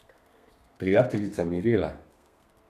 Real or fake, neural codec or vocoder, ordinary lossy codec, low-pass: fake; codec, 32 kHz, 1.9 kbps, SNAC; none; 14.4 kHz